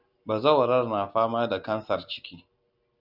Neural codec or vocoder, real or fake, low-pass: none; real; 5.4 kHz